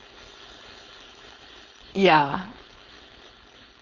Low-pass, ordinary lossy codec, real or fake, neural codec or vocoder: 7.2 kHz; Opus, 32 kbps; fake; codec, 16 kHz, 4.8 kbps, FACodec